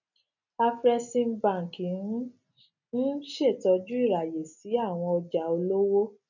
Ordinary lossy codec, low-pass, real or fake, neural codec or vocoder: none; 7.2 kHz; real; none